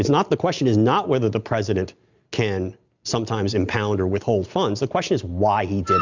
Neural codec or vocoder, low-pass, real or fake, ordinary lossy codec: none; 7.2 kHz; real; Opus, 64 kbps